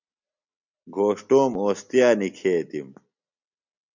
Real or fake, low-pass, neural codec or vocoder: real; 7.2 kHz; none